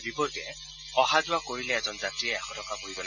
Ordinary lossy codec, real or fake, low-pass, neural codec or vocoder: none; real; 7.2 kHz; none